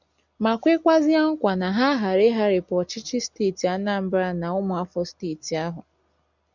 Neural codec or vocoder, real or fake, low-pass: none; real; 7.2 kHz